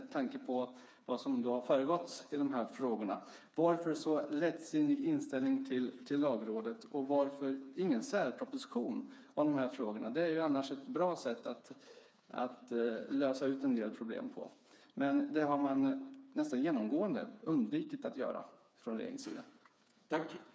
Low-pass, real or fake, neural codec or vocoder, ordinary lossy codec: none; fake; codec, 16 kHz, 4 kbps, FreqCodec, smaller model; none